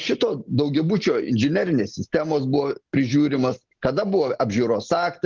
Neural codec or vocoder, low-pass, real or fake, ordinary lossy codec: none; 7.2 kHz; real; Opus, 16 kbps